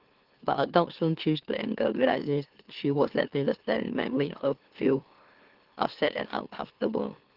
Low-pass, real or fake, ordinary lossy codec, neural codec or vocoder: 5.4 kHz; fake; Opus, 16 kbps; autoencoder, 44.1 kHz, a latent of 192 numbers a frame, MeloTTS